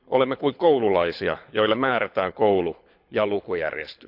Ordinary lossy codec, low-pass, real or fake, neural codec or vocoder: none; 5.4 kHz; fake; codec, 24 kHz, 6 kbps, HILCodec